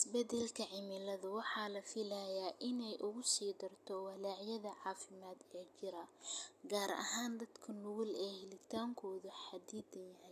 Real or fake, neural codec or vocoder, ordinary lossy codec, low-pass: real; none; none; 14.4 kHz